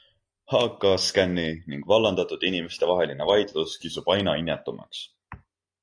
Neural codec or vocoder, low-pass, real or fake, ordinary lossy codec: none; 9.9 kHz; real; AAC, 48 kbps